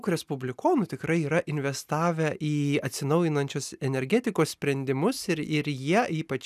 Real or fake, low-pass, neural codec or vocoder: real; 14.4 kHz; none